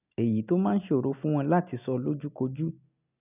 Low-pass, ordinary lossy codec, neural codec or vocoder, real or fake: 3.6 kHz; none; none; real